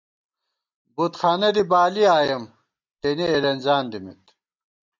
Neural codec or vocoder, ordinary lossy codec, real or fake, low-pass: none; MP3, 64 kbps; real; 7.2 kHz